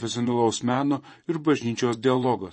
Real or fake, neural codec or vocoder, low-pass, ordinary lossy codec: fake; vocoder, 24 kHz, 100 mel bands, Vocos; 10.8 kHz; MP3, 32 kbps